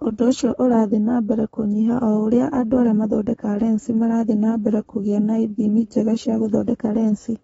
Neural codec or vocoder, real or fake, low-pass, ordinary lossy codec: codec, 44.1 kHz, 7.8 kbps, Pupu-Codec; fake; 19.8 kHz; AAC, 24 kbps